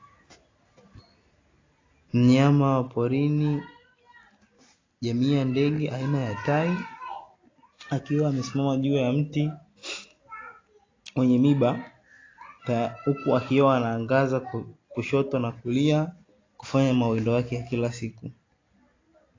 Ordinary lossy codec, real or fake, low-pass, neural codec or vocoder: AAC, 32 kbps; real; 7.2 kHz; none